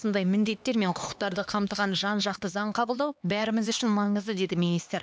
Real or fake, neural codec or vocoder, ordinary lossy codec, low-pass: fake; codec, 16 kHz, 2 kbps, X-Codec, HuBERT features, trained on LibriSpeech; none; none